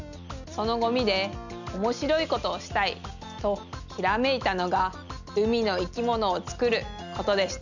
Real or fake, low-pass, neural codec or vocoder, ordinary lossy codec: real; 7.2 kHz; none; none